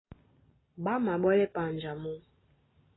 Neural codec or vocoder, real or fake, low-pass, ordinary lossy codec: none; real; 7.2 kHz; AAC, 16 kbps